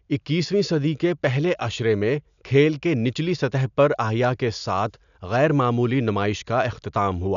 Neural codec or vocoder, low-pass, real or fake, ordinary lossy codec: none; 7.2 kHz; real; none